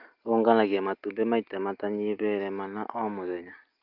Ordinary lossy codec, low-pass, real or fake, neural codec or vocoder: Opus, 32 kbps; 5.4 kHz; real; none